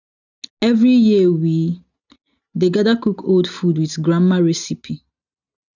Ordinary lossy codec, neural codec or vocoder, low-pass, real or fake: none; none; 7.2 kHz; real